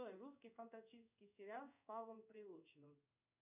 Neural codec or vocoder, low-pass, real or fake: codec, 16 kHz in and 24 kHz out, 1 kbps, XY-Tokenizer; 3.6 kHz; fake